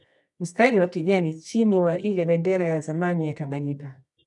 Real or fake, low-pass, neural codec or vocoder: fake; 10.8 kHz; codec, 24 kHz, 0.9 kbps, WavTokenizer, medium music audio release